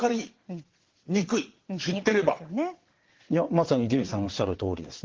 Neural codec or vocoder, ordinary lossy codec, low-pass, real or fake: vocoder, 22.05 kHz, 80 mel bands, WaveNeXt; Opus, 16 kbps; 7.2 kHz; fake